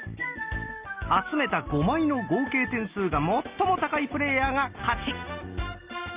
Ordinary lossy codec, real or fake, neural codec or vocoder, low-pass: Opus, 24 kbps; real; none; 3.6 kHz